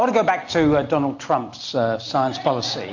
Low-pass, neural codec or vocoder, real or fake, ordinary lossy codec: 7.2 kHz; none; real; MP3, 48 kbps